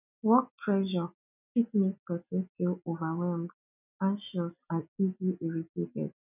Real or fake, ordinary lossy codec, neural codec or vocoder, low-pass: real; none; none; 3.6 kHz